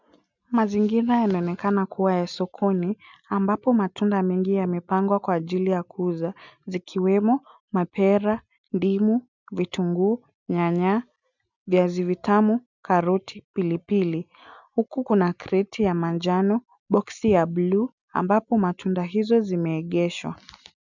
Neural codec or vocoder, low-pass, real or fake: none; 7.2 kHz; real